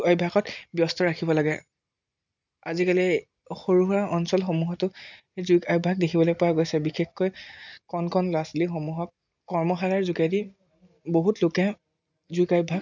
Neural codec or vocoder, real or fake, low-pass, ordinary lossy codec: none; real; 7.2 kHz; none